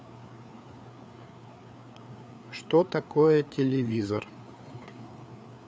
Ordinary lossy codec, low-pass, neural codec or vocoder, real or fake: none; none; codec, 16 kHz, 4 kbps, FreqCodec, larger model; fake